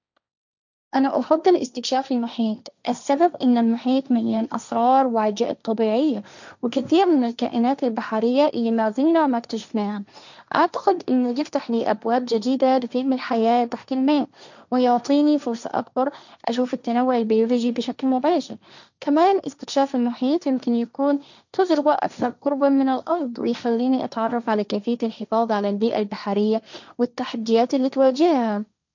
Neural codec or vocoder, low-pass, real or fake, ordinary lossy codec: codec, 16 kHz, 1.1 kbps, Voila-Tokenizer; 7.2 kHz; fake; none